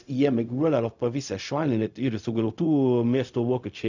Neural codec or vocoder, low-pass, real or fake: codec, 16 kHz, 0.4 kbps, LongCat-Audio-Codec; 7.2 kHz; fake